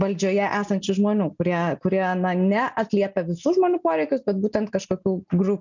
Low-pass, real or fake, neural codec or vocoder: 7.2 kHz; real; none